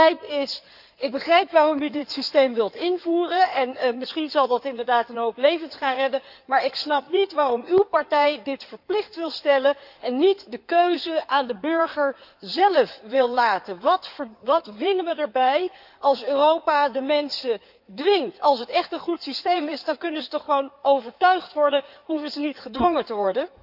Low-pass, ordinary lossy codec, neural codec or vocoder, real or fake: 5.4 kHz; none; codec, 16 kHz in and 24 kHz out, 2.2 kbps, FireRedTTS-2 codec; fake